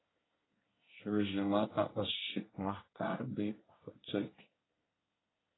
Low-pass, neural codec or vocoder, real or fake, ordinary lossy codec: 7.2 kHz; codec, 24 kHz, 1 kbps, SNAC; fake; AAC, 16 kbps